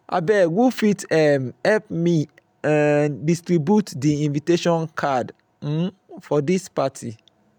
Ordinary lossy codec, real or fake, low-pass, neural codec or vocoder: none; real; none; none